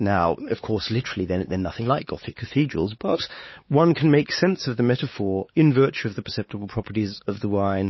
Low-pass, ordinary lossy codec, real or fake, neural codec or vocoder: 7.2 kHz; MP3, 24 kbps; fake; codec, 16 kHz, 4 kbps, X-Codec, WavLM features, trained on Multilingual LibriSpeech